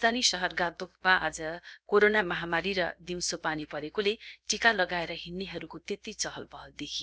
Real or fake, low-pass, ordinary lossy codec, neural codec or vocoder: fake; none; none; codec, 16 kHz, about 1 kbps, DyCAST, with the encoder's durations